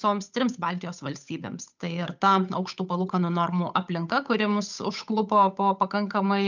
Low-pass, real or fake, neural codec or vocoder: 7.2 kHz; fake; codec, 16 kHz, 8 kbps, FunCodec, trained on Chinese and English, 25 frames a second